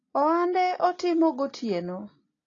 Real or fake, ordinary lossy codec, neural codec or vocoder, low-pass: real; AAC, 32 kbps; none; 7.2 kHz